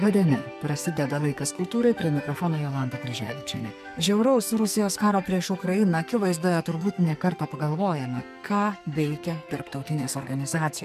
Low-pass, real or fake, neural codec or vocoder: 14.4 kHz; fake; codec, 44.1 kHz, 2.6 kbps, SNAC